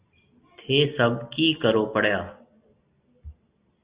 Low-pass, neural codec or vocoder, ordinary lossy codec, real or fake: 3.6 kHz; none; Opus, 64 kbps; real